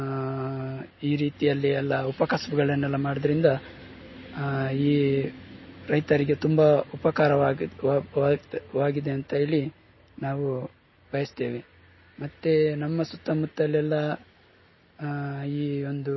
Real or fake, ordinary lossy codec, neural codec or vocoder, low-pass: real; MP3, 24 kbps; none; 7.2 kHz